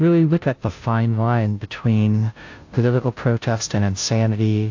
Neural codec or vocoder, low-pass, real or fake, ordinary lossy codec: codec, 16 kHz, 0.5 kbps, FunCodec, trained on Chinese and English, 25 frames a second; 7.2 kHz; fake; AAC, 48 kbps